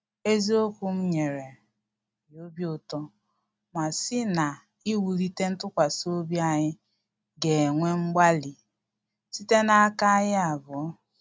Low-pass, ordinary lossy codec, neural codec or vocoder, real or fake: none; none; none; real